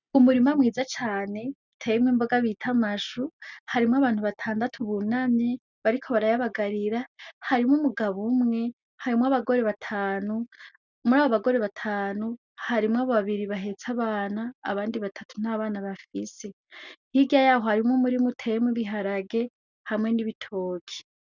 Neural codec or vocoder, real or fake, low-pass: none; real; 7.2 kHz